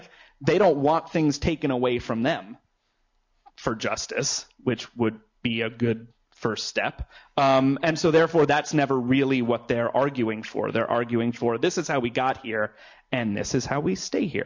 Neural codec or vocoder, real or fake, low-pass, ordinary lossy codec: none; real; 7.2 kHz; MP3, 48 kbps